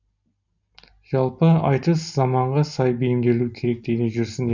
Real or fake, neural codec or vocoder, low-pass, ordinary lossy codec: real; none; 7.2 kHz; none